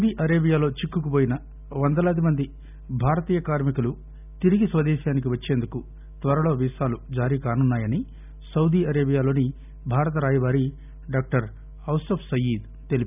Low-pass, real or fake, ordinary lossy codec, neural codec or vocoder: 3.6 kHz; real; none; none